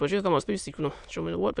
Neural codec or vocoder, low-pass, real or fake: autoencoder, 22.05 kHz, a latent of 192 numbers a frame, VITS, trained on many speakers; 9.9 kHz; fake